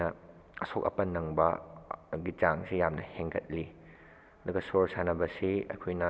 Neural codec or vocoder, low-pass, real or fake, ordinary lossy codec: none; none; real; none